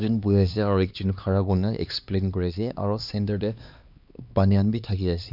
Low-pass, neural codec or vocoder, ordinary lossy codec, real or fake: 5.4 kHz; codec, 16 kHz, 2 kbps, X-Codec, HuBERT features, trained on LibriSpeech; none; fake